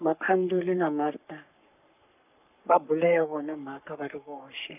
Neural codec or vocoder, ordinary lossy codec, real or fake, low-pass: codec, 44.1 kHz, 3.4 kbps, Pupu-Codec; none; fake; 3.6 kHz